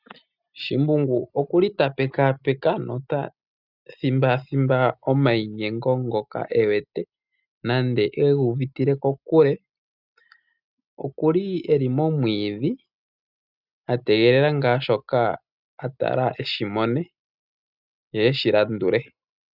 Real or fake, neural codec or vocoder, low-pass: real; none; 5.4 kHz